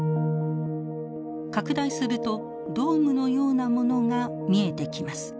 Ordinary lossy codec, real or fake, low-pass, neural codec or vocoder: none; real; none; none